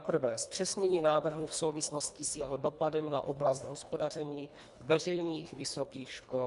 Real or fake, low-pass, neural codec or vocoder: fake; 10.8 kHz; codec, 24 kHz, 1.5 kbps, HILCodec